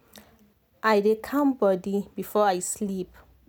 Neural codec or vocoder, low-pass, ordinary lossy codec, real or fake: none; none; none; real